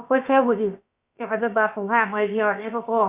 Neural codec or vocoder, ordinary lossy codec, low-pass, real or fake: codec, 16 kHz, about 1 kbps, DyCAST, with the encoder's durations; Opus, 64 kbps; 3.6 kHz; fake